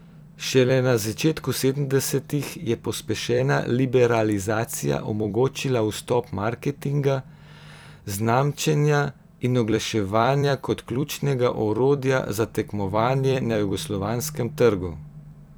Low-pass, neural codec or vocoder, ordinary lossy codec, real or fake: none; vocoder, 44.1 kHz, 128 mel bands every 256 samples, BigVGAN v2; none; fake